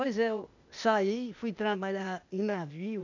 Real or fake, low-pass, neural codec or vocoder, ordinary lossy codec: fake; 7.2 kHz; codec, 16 kHz, 0.8 kbps, ZipCodec; none